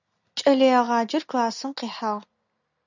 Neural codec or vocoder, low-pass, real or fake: none; 7.2 kHz; real